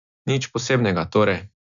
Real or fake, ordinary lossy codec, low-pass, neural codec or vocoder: real; MP3, 96 kbps; 7.2 kHz; none